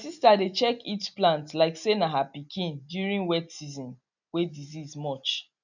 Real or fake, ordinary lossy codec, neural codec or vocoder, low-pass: real; none; none; 7.2 kHz